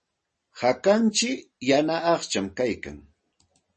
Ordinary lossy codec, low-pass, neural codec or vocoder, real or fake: MP3, 32 kbps; 10.8 kHz; none; real